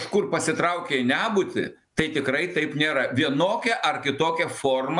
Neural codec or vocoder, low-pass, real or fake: none; 10.8 kHz; real